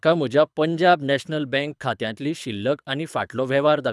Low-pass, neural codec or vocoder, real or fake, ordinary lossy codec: none; codec, 24 kHz, 6 kbps, HILCodec; fake; none